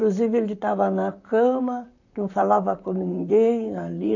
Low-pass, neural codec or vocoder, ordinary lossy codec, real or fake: 7.2 kHz; vocoder, 44.1 kHz, 128 mel bands, Pupu-Vocoder; none; fake